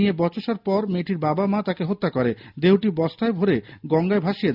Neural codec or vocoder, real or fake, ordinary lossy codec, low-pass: none; real; none; 5.4 kHz